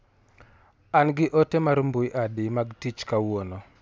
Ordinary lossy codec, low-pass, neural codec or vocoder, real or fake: none; none; none; real